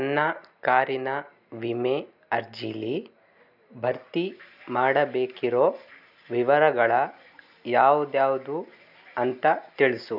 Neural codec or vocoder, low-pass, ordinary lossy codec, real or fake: none; 5.4 kHz; none; real